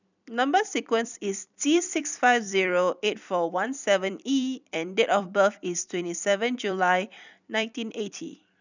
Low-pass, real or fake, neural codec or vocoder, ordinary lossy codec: 7.2 kHz; fake; vocoder, 44.1 kHz, 128 mel bands every 256 samples, BigVGAN v2; none